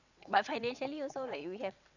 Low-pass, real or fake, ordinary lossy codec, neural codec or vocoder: 7.2 kHz; fake; none; vocoder, 22.05 kHz, 80 mel bands, WaveNeXt